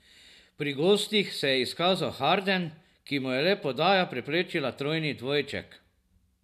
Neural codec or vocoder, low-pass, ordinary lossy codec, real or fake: none; 14.4 kHz; none; real